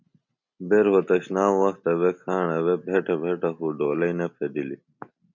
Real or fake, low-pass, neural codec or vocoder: real; 7.2 kHz; none